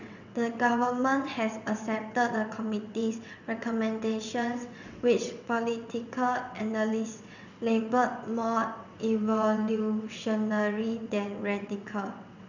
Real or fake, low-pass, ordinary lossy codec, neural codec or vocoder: fake; 7.2 kHz; none; vocoder, 22.05 kHz, 80 mel bands, WaveNeXt